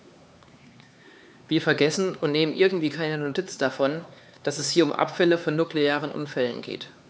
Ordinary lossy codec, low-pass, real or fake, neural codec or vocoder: none; none; fake; codec, 16 kHz, 4 kbps, X-Codec, HuBERT features, trained on LibriSpeech